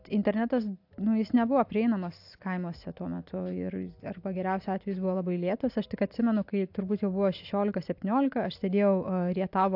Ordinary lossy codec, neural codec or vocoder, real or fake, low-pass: AAC, 48 kbps; none; real; 5.4 kHz